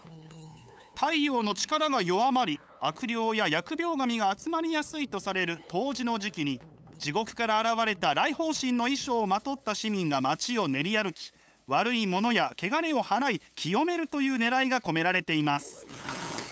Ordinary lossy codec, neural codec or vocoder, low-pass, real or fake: none; codec, 16 kHz, 8 kbps, FunCodec, trained on LibriTTS, 25 frames a second; none; fake